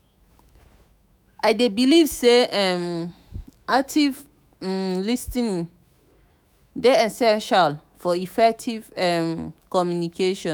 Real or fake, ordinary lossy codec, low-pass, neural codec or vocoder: fake; none; none; autoencoder, 48 kHz, 128 numbers a frame, DAC-VAE, trained on Japanese speech